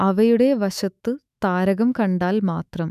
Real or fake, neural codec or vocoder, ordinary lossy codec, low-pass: fake; autoencoder, 48 kHz, 128 numbers a frame, DAC-VAE, trained on Japanese speech; none; 14.4 kHz